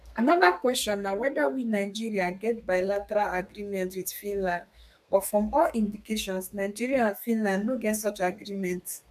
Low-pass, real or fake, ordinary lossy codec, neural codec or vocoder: 14.4 kHz; fake; none; codec, 32 kHz, 1.9 kbps, SNAC